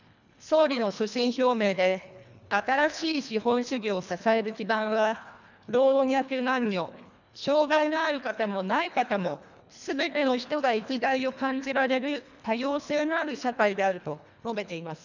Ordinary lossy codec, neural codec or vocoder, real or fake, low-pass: none; codec, 24 kHz, 1.5 kbps, HILCodec; fake; 7.2 kHz